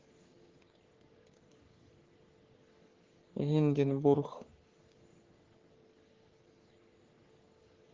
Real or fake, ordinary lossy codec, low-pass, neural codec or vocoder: fake; Opus, 16 kbps; 7.2 kHz; codec, 44.1 kHz, 3.4 kbps, Pupu-Codec